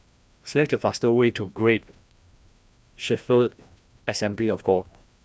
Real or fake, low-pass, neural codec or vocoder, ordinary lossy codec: fake; none; codec, 16 kHz, 1 kbps, FreqCodec, larger model; none